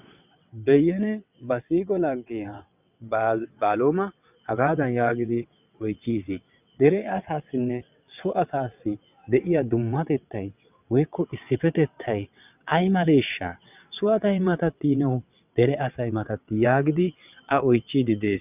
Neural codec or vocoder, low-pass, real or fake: vocoder, 22.05 kHz, 80 mel bands, WaveNeXt; 3.6 kHz; fake